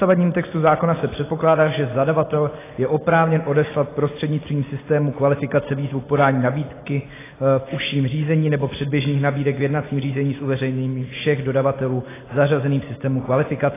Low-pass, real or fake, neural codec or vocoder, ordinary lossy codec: 3.6 kHz; real; none; AAC, 16 kbps